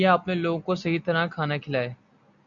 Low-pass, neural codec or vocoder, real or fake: 7.2 kHz; none; real